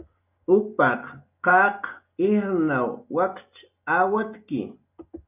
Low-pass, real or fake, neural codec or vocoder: 3.6 kHz; real; none